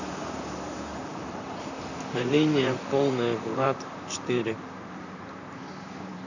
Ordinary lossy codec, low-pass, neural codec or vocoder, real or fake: none; 7.2 kHz; vocoder, 44.1 kHz, 128 mel bands, Pupu-Vocoder; fake